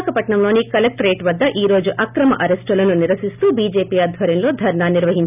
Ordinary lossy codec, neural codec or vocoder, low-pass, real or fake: none; none; 3.6 kHz; real